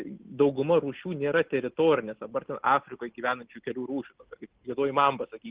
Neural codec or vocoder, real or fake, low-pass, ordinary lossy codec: none; real; 3.6 kHz; Opus, 16 kbps